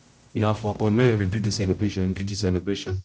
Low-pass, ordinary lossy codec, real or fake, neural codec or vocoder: none; none; fake; codec, 16 kHz, 0.5 kbps, X-Codec, HuBERT features, trained on general audio